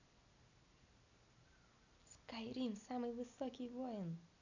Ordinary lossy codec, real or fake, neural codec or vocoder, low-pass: none; real; none; 7.2 kHz